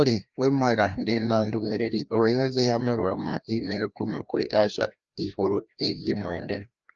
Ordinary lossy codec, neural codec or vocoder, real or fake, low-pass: Opus, 24 kbps; codec, 16 kHz, 1 kbps, FreqCodec, larger model; fake; 7.2 kHz